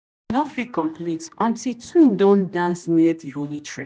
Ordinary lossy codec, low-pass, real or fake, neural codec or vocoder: none; none; fake; codec, 16 kHz, 1 kbps, X-Codec, HuBERT features, trained on general audio